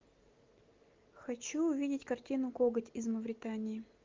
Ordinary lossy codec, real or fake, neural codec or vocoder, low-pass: Opus, 32 kbps; real; none; 7.2 kHz